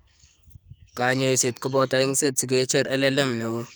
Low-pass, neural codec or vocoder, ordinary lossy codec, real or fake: none; codec, 44.1 kHz, 2.6 kbps, SNAC; none; fake